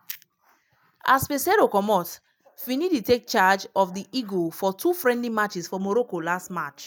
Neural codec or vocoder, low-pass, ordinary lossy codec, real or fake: none; none; none; real